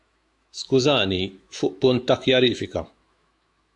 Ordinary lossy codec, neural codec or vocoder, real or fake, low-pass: AAC, 64 kbps; autoencoder, 48 kHz, 128 numbers a frame, DAC-VAE, trained on Japanese speech; fake; 10.8 kHz